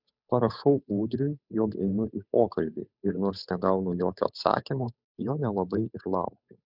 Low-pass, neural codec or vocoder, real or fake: 5.4 kHz; codec, 16 kHz, 8 kbps, FunCodec, trained on Chinese and English, 25 frames a second; fake